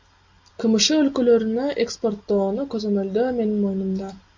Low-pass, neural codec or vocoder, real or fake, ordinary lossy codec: 7.2 kHz; none; real; MP3, 64 kbps